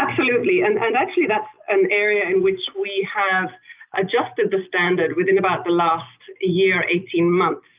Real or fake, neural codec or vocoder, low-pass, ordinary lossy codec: real; none; 3.6 kHz; Opus, 24 kbps